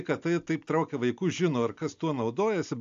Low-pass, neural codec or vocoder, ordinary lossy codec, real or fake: 7.2 kHz; none; AAC, 64 kbps; real